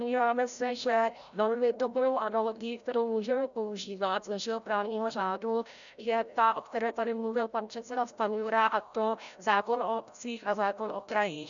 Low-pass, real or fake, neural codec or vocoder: 7.2 kHz; fake; codec, 16 kHz, 0.5 kbps, FreqCodec, larger model